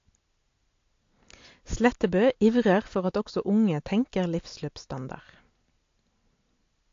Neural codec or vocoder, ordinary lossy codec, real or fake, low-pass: none; AAC, 48 kbps; real; 7.2 kHz